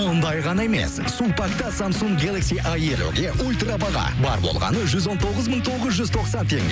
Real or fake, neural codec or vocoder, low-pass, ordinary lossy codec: real; none; none; none